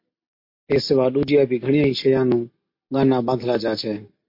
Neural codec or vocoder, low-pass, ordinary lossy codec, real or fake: none; 5.4 kHz; MP3, 32 kbps; real